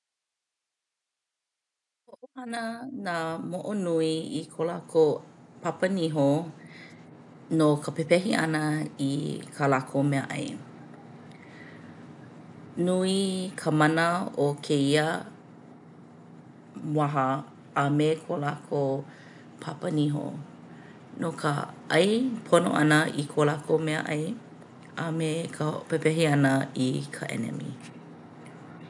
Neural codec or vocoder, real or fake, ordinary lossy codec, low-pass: none; real; none; 10.8 kHz